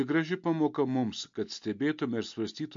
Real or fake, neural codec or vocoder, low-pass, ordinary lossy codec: real; none; 7.2 kHz; MP3, 48 kbps